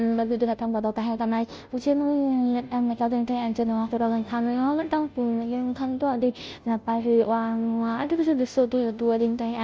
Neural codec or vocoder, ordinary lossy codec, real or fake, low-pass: codec, 16 kHz, 0.5 kbps, FunCodec, trained on Chinese and English, 25 frames a second; none; fake; none